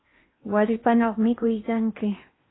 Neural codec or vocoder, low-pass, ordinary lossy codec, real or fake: codec, 16 kHz in and 24 kHz out, 0.8 kbps, FocalCodec, streaming, 65536 codes; 7.2 kHz; AAC, 16 kbps; fake